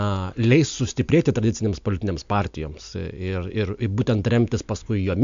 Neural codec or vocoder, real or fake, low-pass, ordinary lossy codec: none; real; 7.2 kHz; MP3, 64 kbps